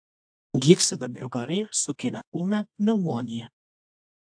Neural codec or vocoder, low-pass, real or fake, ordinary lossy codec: codec, 24 kHz, 0.9 kbps, WavTokenizer, medium music audio release; 9.9 kHz; fake; AAC, 64 kbps